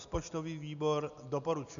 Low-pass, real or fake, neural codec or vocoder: 7.2 kHz; real; none